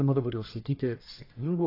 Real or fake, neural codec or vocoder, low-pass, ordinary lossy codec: fake; codec, 44.1 kHz, 1.7 kbps, Pupu-Codec; 5.4 kHz; AAC, 24 kbps